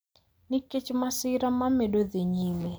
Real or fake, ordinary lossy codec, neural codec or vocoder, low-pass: real; none; none; none